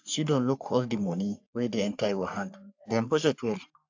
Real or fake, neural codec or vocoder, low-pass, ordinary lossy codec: fake; codec, 44.1 kHz, 3.4 kbps, Pupu-Codec; 7.2 kHz; AAC, 48 kbps